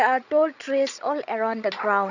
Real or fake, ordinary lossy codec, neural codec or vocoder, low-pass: fake; none; codec, 16 kHz, 16 kbps, FreqCodec, larger model; 7.2 kHz